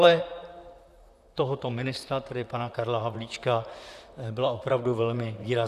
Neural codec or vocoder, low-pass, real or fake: vocoder, 44.1 kHz, 128 mel bands, Pupu-Vocoder; 14.4 kHz; fake